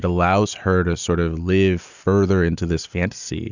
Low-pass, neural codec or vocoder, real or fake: 7.2 kHz; none; real